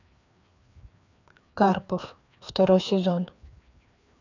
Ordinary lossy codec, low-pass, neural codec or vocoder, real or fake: none; 7.2 kHz; codec, 16 kHz, 2 kbps, FreqCodec, larger model; fake